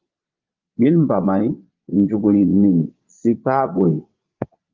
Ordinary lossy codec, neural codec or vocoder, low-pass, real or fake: Opus, 32 kbps; vocoder, 22.05 kHz, 80 mel bands, Vocos; 7.2 kHz; fake